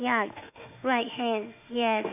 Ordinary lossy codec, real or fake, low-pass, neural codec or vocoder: none; fake; 3.6 kHz; autoencoder, 48 kHz, 32 numbers a frame, DAC-VAE, trained on Japanese speech